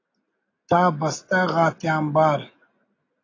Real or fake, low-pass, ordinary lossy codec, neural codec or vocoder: real; 7.2 kHz; AAC, 32 kbps; none